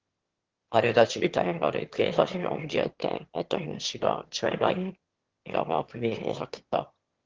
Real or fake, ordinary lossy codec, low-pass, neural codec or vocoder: fake; Opus, 16 kbps; 7.2 kHz; autoencoder, 22.05 kHz, a latent of 192 numbers a frame, VITS, trained on one speaker